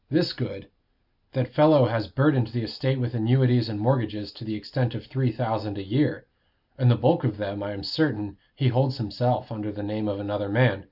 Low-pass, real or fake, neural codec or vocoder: 5.4 kHz; real; none